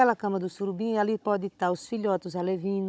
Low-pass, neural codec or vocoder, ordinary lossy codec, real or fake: none; codec, 16 kHz, 16 kbps, FunCodec, trained on Chinese and English, 50 frames a second; none; fake